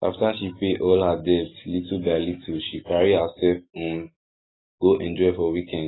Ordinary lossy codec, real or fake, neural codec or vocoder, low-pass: AAC, 16 kbps; real; none; 7.2 kHz